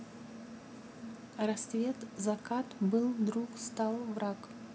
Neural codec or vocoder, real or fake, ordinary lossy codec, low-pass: none; real; none; none